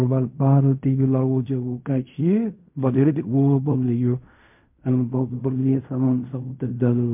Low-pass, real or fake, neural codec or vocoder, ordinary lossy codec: 3.6 kHz; fake; codec, 16 kHz in and 24 kHz out, 0.4 kbps, LongCat-Audio-Codec, fine tuned four codebook decoder; MP3, 32 kbps